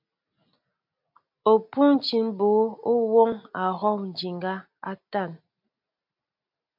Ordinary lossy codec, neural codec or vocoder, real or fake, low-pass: MP3, 48 kbps; none; real; 5.4 kHz